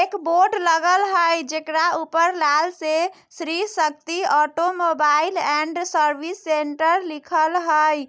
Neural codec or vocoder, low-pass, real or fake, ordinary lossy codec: none; none; real; none